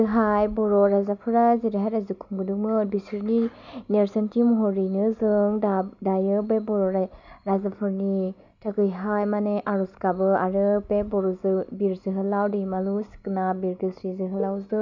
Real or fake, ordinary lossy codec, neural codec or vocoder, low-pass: real; none; none; 7.2 kHz